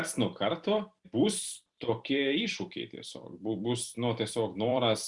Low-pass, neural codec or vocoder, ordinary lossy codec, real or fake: 10.8 kHz; vocoder, 44.1 kHz, 128 mel bands every 256 samples, BigVGAN v2; Opus, 64 kbps; fake